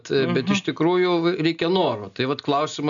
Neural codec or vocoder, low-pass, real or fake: none; 7.2 kHz; real